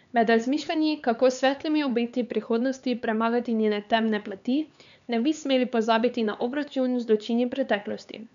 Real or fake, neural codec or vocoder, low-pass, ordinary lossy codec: fake; codec, 16 kHz, 4 kbps, X-Codec, HuBERT features, trained on LibriSpeech; 7.2 kHz; MP3, 96 kbps